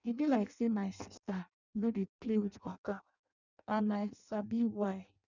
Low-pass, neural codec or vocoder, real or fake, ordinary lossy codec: 7.2 kHz; codec, 16 kHz in and 24 kHz out, 0.6 kbps, FireRedTTS-2 codec; fake; none